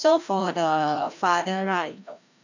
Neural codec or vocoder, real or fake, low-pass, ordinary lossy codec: codec, 16 kHz, 1 kbps, FreqCodec, larger model; fake; 7.2 kHz; none